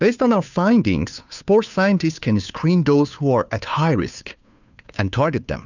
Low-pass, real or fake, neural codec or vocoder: 7.2 kHz; fake; codec, 16 kHz, 2 kbps, FunCodec, trained on Chinese and English, 25 frames a second